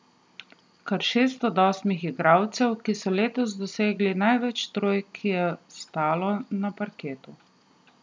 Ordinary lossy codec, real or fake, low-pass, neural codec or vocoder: none; real; none; none